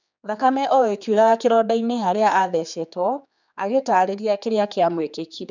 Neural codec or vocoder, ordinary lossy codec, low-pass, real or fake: codec, 16 kHz, 4 kbps, X-Codec, HuBERT features, trained on general audio; none; 7.2 kHz; fake